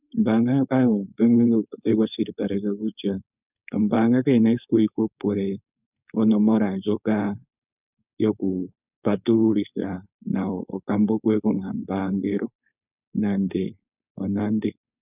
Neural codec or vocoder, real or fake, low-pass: codec, 16 kHz, 4.8 kbps, FACodec; fake; 3.6 kHz